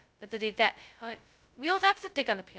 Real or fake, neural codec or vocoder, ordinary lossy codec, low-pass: fake; codec, 16 kHz, 0.2 kbps, FocalCodec; none; none